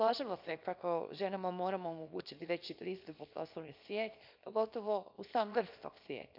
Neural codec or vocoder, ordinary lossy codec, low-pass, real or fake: codec, 24 kHz, 0.9 kbps, WavTokenizer, small release; none; 5.4 kHz; fake